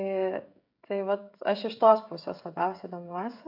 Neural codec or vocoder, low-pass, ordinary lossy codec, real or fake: codec, 16 kHz, 16 kbps, FreqCodec, smaller model; 5.4 kHz; AAC, 48 kbps; fake